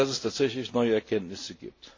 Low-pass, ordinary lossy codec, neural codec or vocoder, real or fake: 7.2 kHz; AAC, 48 kbps; none; real